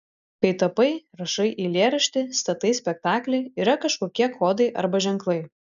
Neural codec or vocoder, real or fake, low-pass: none; real; 7.2 kHz